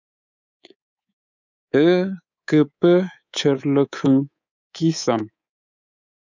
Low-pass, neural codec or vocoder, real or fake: 7.2 kHz; codec, 24 kHz, 3.1 kbps, DualCodec; fake